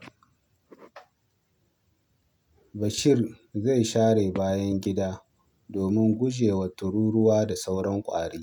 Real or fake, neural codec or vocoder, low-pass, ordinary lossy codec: real; none; 19.8 kHz; none